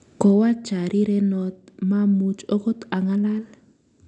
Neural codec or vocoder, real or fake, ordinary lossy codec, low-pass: none; real; none; 10.8 kHz